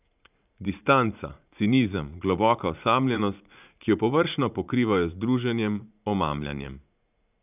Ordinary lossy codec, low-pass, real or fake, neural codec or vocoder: none; 3.6 kHz; fake; vocoder, 44.1 kHz, 80 mel bands, Vocos